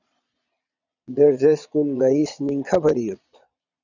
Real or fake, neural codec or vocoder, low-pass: fake; vocoder, 22.05 kHz, 80 mel bands, Vocos; 7.2 kHz